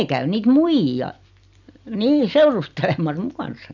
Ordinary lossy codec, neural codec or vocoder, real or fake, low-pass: none; none; real; 7.2 kHz